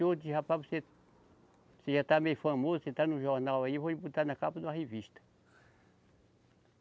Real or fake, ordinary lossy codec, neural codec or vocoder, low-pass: real; none; none; none